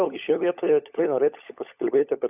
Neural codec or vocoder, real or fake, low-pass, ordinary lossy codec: codec, 16 kHz, 16 kbps, FunCodec, trained on LibriTTS, 50 frames a second; fake; 3.6 kHz; AAC, 32 kbps